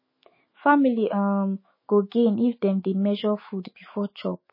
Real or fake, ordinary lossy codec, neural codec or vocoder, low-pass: fake; MP3, 24 kbps; autoencoder, 48 kHz, 128 numbers a frame, DAC-VAE, trained on Japanese speech; 5.4 kHz